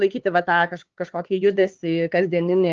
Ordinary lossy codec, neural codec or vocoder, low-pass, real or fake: Opus, 16 kbps; codec, 16 kHz, 4 kbps, X-Codec, HuBERT features, trained on LibriSpeech; 7.2 kHz; fake